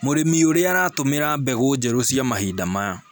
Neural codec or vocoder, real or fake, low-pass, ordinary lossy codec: none; real; none; none